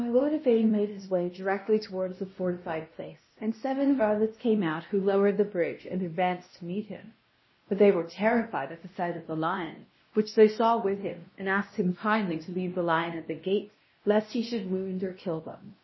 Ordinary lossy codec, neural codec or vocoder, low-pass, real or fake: MP3, 24 kbps; codec, 16 kHz, 1 kbps, X-Codec, WavLM features, trained on Multilingual LibriSpeech; 7.2 kHz; fake